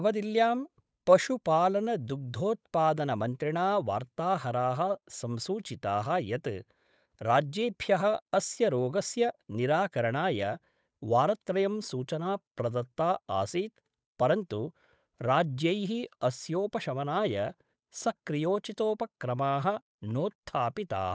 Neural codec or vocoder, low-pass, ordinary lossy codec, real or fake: codec, 16 kHz, 8 kbps, FunCodec, trained on Chinese and English, 25 frames a second; none; none; fake